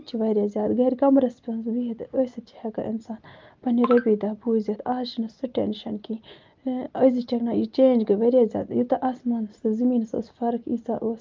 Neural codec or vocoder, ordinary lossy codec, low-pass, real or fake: none; Opus, 24 kbps; 7.2 kHz; real